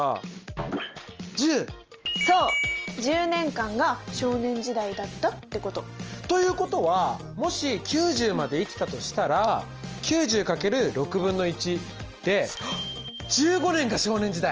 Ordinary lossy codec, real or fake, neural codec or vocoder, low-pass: Opus, 24 kbps; real; none; 7.2 kHz